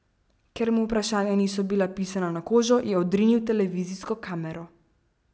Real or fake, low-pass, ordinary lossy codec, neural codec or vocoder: real; none; none; none